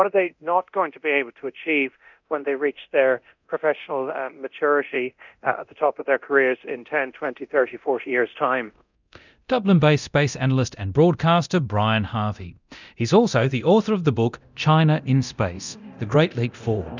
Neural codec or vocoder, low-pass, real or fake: codec, 24 kHz, 0.9 kbps, DualCodec; 7.2 kHz; fake